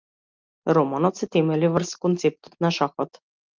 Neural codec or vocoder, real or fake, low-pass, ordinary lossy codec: none; real; 7.2 kHz; Opus, 24 kbps